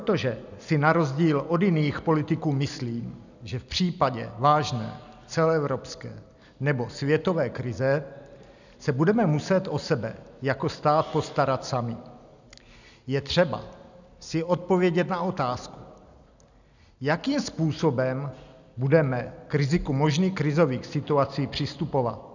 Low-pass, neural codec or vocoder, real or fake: 7.2 kHz; none; real